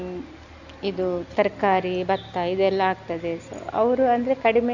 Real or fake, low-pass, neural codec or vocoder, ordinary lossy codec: real; 7.2 kHz; none; none